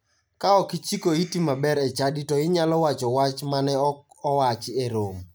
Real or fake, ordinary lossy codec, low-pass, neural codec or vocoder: real; none; none; none